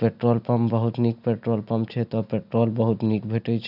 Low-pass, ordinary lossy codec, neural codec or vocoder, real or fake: 5.4 kHz; none; none; real